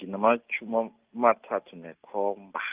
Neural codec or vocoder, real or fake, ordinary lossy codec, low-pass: none; real; Opus, 32 kbps; 3.6 kHz